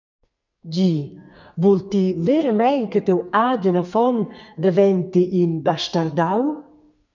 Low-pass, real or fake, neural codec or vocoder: 7.2 kHz; fake; codec, 32 kHz, 1.9 kbps, SNAC